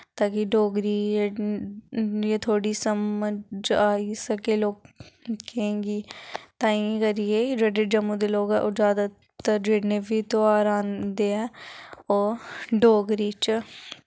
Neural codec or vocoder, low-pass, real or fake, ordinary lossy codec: none; none; real; none